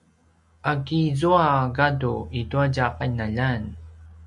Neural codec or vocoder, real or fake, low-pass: none; real; 10.8 kHz